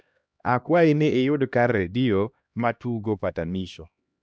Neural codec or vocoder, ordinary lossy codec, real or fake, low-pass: codec, 16 kHz, 1 kbps, X-Codec, HuBERT features, trained on LibriSpeech; none; fake; none